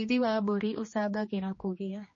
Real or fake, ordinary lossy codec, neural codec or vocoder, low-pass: fake; MP3, 32 kbps; codec, 16 kHz, 2 kbps, X-Codec, HuBERT features, trained on general audio; 7.2 kHz